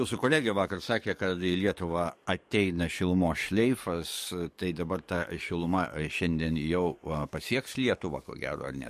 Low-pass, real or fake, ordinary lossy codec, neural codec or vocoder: 14.4 kHz; fake; MP3, 64 kbps; codec, 44.1 kHz, 7.8 kbps, DAC